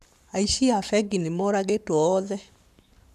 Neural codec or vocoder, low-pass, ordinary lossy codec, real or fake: codec, 44.1 kHz, 7.8 kbps, Pupu-Codec; 14.4 kHz; none; fake